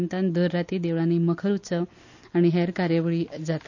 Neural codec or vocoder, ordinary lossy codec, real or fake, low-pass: none; none; real; 7.2 kHz